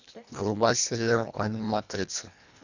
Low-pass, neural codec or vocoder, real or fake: 7.2 kHz; codec, 24 kHz, 1.5 kbps, HILCodec; fake